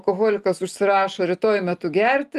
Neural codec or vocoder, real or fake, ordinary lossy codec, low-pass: none; real; Opus, 32 kbps; 14.4 kHz